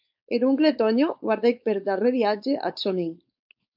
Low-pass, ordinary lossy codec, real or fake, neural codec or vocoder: 5.4 kHz; MP3, 48 kbps; fake; codec, 16 kHz, 4.8 kbps, FACodec